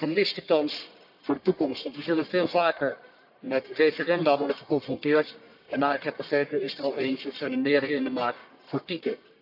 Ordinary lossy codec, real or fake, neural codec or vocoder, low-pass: none; fake; codec, 44.1 kHz, 1.7 kbps, Pupu-Codec; 5.4 kHz